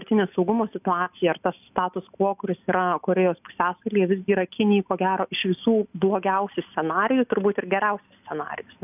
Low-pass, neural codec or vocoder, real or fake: 3.6 kHz; none; real